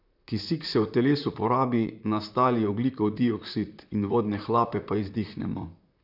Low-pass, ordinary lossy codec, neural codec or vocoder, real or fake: 5.4 kHz; none; vocoder, 44.1 kHz, 128 mel bands, Pupu-Vocoder; fake